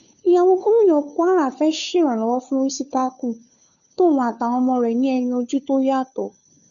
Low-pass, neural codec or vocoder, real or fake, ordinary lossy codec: 7.2 kHz; codec, 16 kHz, 2 kbps, FunCodec, trained on Chinese and English, 25 frames a second; fake; none